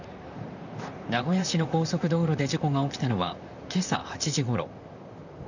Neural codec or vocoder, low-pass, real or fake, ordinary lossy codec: vocoder, 44.1 kHz, 128 mel bands, Pupu-Vocoder; 7.2 kHz; fake; none